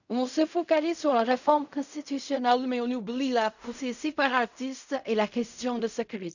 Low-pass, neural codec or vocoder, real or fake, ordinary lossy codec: 7.2 kHz; codec, 16 kHz in and 24 kHz out, 0.4 kbps, LongCat-Audio-Codec, fine tuned four codebook decoder; fake; none